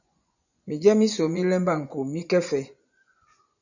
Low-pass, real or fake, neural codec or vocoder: 7.2 kHz; fake; vocoder, 24 kHz, 100 mel bands, Vocos